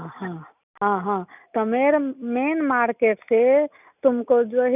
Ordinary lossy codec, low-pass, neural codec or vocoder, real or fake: none; 3.6 kHz; none; real